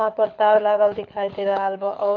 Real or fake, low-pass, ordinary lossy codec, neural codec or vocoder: fake; 7.2 kHz; none; codec, 24 kHz, 6 kbps, HILCodec